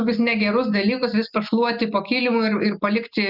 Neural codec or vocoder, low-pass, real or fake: none; 5.4 kHz; real